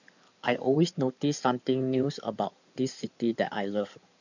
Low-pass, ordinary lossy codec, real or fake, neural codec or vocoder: 7.2 kHz; none; fake; codec, 16 kHz in and 24 kHz out, 2.2 kbps, FireRedTTS-2 codec